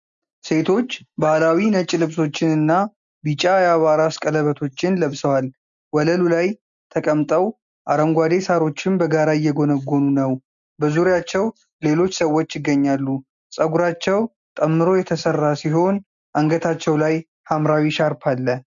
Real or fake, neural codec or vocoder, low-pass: real; none; 7.2 kHz